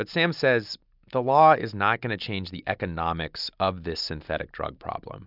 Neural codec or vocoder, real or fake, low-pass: none; real; 5.4 kHz